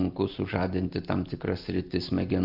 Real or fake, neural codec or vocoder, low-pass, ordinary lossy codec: real; none; 5.4 kHz; Opus, 32 kbps